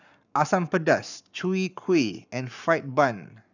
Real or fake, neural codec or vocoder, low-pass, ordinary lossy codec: fake; codec, 44.1 kHz, 7.8 kbps, Pupu-Codec; 7.2 kHz; none